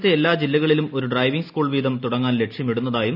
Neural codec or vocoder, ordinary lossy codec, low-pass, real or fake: none; none; 5.4 kHz; real